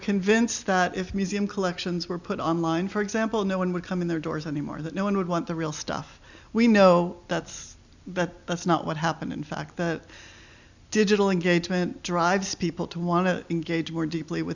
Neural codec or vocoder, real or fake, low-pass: none; real; 7.2 kHz